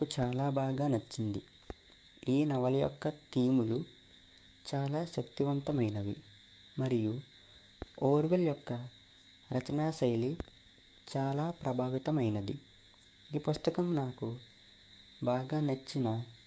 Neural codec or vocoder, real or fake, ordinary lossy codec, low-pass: codec, 16 kHz, 6 kbps, DAC; fake; none; none